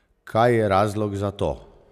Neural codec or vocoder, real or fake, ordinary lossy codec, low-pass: none; real; none; 14.4 kHz